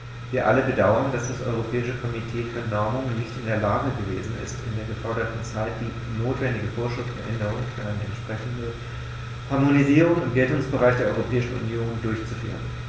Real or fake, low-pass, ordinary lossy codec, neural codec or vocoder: real; none; none; none